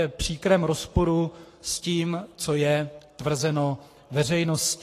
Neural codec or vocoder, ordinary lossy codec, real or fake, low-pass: codec, 44.1 kHz, 7.8 kbps, Pupu-Codec; AAC, 48 kbps; fake; 14.4 kHz